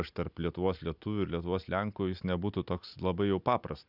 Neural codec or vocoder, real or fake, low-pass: none; real; 5.4 kHz